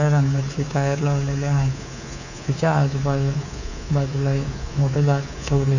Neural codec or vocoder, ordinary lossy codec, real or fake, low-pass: codec, 24 kHz, 3.1 kbps, DualCodec; none; fake; 7.2 kHz